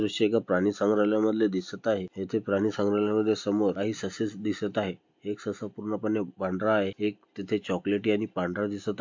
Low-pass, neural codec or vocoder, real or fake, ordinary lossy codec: 7.2 kHz; none; real; MP3, 48 kbps